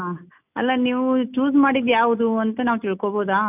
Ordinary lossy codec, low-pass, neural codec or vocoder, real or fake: none; 3.6 kHz; none; real